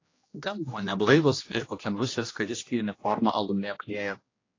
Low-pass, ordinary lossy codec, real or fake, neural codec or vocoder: 7.2 kHz; AAC, 32 kbps; fake; codec, 16 kHz, 1 kbps, X-Codec, HuBERT features, trained on general audio